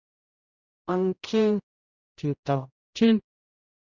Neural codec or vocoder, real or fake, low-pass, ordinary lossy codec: codec, 16 kHz, 0.5 kbps, X-Codec, HuBERT features, trained on general audio; fake; 7.2 kHz; Opus, 64 kbps